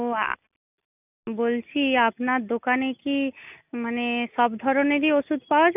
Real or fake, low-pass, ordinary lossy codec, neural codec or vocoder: real; 3.6 kHz; none; none